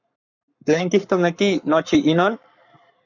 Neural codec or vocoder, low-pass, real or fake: codec, 44.1 kHz, 7.8 kbps, Pupu-Codec; 7.2 kHz; fake